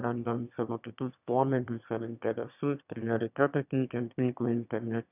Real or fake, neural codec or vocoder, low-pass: fake; autoencoder, 22.05 kHz, a latent of 192 numbers a frame, VITS, trained on one speaker; 3.6 kHz